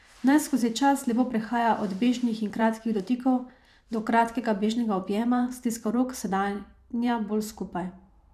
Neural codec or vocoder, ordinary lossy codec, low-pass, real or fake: vocoder, 44.1 kHz, 128 mel bands every 512 samples, BigVGAN v2; none; 14.4 kHz; fake